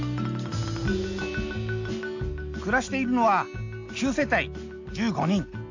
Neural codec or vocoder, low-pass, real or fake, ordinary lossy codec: none; 7.2 kHz; real; AAC, 48 kbps